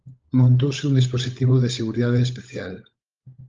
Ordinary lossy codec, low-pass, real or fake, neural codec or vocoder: Opus, 24 kbps; 7.2 kHz; fake; codec, 16 kHz, 16 kbps, FunCodec, trained on LibriTTS, 50 frames a second